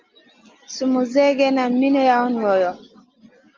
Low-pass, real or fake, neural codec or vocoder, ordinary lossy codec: 7.2 kHz; real; none; Opus, 24 kbps